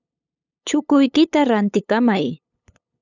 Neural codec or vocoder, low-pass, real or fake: codec, 16 kHz, 8 kbps, FunCodec, trained on LibriTTS, 25 frames a second; 7.2 kHz; fake